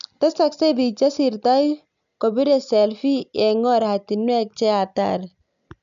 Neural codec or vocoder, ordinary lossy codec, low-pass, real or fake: none; AAC, 96 kbps; 7.2 kHz; real